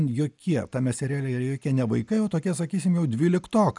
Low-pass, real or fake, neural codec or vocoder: 10.8 kHz; real; none